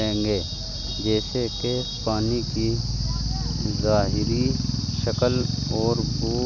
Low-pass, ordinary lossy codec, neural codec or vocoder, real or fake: 7.2 kHz; none; none; real